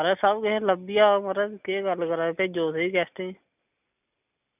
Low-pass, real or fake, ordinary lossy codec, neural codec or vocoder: 3.6 kHz; real; none; none